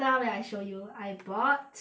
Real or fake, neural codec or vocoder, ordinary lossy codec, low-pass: real; none; none; none